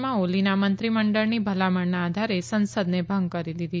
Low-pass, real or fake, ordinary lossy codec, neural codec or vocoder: none; real; none; none